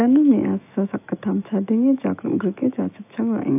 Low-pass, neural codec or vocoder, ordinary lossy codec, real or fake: 3.6 kHz; autoencoder, 48 kHz, 128 numbers a frame, DAC-VAE, trained on Japanese speech; AAC, 32 kbps; fake